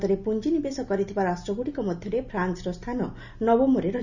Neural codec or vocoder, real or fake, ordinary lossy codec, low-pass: none; real; none; 7.2 kHz